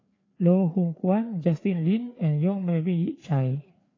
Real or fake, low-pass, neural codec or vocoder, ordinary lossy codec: fake; 7.2 kHz; codec, 16 kHz, 4 kbps, FreqCodec, larger model; AAC, 32 kbps